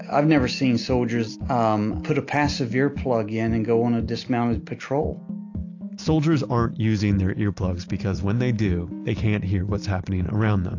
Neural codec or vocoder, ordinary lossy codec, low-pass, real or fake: none; AAC, 48 kbps; 7.2 kHz; real